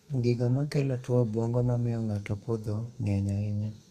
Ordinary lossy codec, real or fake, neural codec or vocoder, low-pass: Opus, 64 kbps; fake; codec, 32 kHz, 1.9 kbps, SNAC; 14.4 kHz